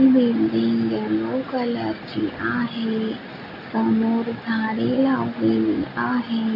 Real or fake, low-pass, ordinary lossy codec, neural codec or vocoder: real; 5.4 kHz; none; none